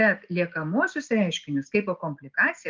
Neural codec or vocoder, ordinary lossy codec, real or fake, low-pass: none; Opus, 32 kbps; real; 7.2 kHz